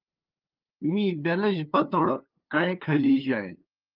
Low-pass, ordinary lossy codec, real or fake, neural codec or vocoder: 5.4 kHz; Opus, 24 kbps; fake; codec, 16 kHz, 2 kbps, FunCodec, trained on LibriTTS, 25 frames a second